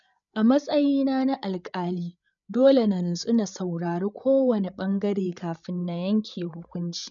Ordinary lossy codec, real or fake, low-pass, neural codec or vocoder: MP3, 96 kbps; fake; 7.2 kHz; codec, 16 kHz, 8 kbps, FreqCodec, larger model